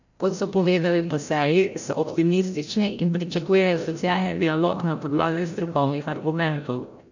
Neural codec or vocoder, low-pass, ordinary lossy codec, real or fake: codec, 16 kHz, 0.5 kbps, FreqCodec, larger model; 7.2 kHz; none; fake